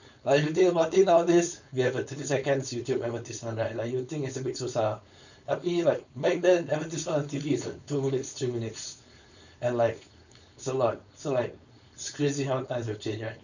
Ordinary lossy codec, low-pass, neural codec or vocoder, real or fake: none; 7.2 kHz; codec, 16 kHz, 4.8 kbps, FACodec; fake